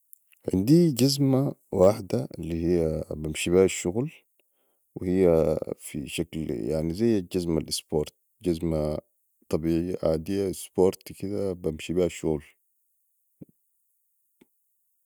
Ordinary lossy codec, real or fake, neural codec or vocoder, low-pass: none; real; none; none